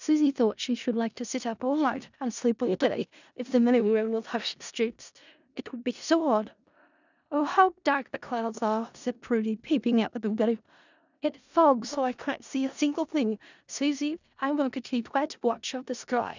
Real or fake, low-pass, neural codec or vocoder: fake; 7.2 kHz; codec, 16 kHz in and 24 kHz out, 0.4 kbps, LongCat-Audio-Codec, four codebook decoder